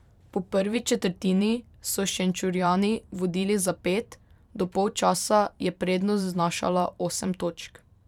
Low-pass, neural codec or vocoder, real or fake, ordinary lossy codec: 19.8 kHz; vocoder, 44.1 kHz, 128 mel bands every 512 samples, BigVGAN v2; fake; none